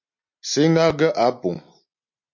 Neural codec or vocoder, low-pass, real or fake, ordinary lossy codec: none; 7.2 kHz; real; MP3, 48 kbps